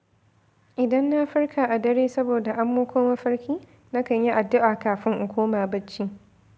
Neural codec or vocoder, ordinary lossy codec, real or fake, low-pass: none; none; real; none